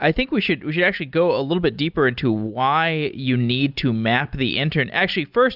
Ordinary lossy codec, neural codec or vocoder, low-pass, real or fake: Opus, 64 kbps; none; 5.4 kHz; real